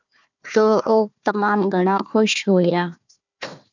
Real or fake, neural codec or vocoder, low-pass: fake; codec, 16 kHz, 1 kbps, FunCodec, trained on Chinese and English, 50 frames a second; 7.2 kHz